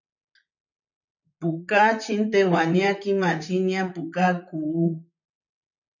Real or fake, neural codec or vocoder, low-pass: fake; vocoder, 44.1 kHz, 128 mel bands, Pupu-Vocoder; 7.2 kHz